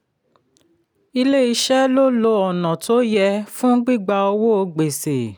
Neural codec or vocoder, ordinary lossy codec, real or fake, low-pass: none; none; real; none